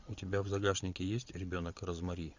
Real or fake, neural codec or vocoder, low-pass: fake; vocoder, 22.05 kHz, 80 mel bands, Vocos; 7.2 kHz